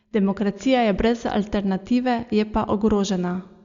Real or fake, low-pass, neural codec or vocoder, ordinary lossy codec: real; 7.2 kHz; none; Opus, 64 kbps